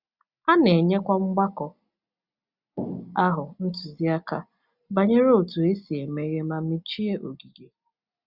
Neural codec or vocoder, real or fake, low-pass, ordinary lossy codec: none; real; 5.4 kHz; Opus, 64 kbps